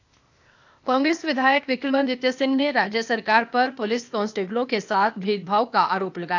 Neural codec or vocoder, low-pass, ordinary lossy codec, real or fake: codec, 16 kHz, 0.8 kbps, ZipCodec; 7.2 kHz; none; fake